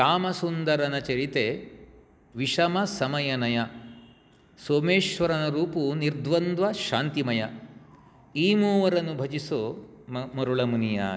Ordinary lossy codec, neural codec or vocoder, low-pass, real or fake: none; none; none; real